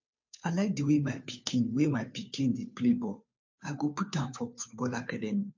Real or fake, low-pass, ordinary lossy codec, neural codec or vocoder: fake; 7.2 kHz; MP3, 48 kbps; codec, 16 kHz, 2 kbps, FunCodec, trained on Chinese and English, 25 frames a second